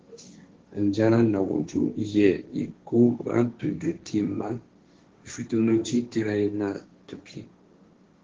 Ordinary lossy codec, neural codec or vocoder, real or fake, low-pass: Opus, 16 kbps; codec, 16 kHz, 1.1 kbps, Voila-Tokenizer; fake; 7.2 kHz